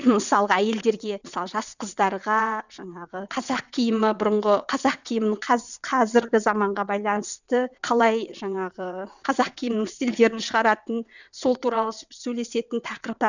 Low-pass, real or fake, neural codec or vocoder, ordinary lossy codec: 7.2 kHz; fake; vocoder, 22.05 kHz, 80 mel bands, WaveNeXt; none